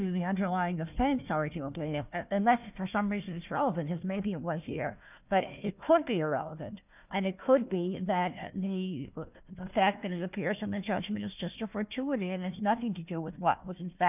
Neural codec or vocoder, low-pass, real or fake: codec, 16 kHz, 1 kbps, FunCodec, trained on Chinese and English, 50 frames a second; 3.6 kHz; fake